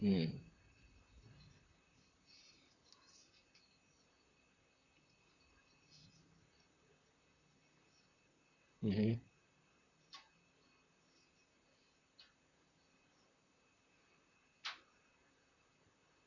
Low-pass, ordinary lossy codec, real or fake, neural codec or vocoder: 7.2 kHz; none; fake; vocoder, 44.1 kHz, 128 mel bands, Pupu-Vocoder